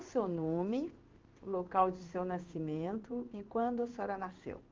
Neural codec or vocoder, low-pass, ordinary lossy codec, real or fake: codec, 16 kHz in and 24 kHz out, 1 kbps, XY-Tokenizer; 7.2 kHz; Opus, 24 kbps; fake